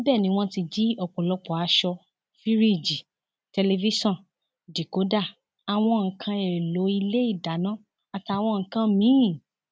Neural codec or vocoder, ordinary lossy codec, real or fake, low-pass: none; none; real; none